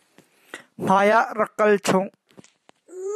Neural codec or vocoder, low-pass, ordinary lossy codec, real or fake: vocoder, 44.1 kHz, 128 mel bands every 256 samples, BigVGAN v2; 14.4 kHz; MP3, 96 kbps; fake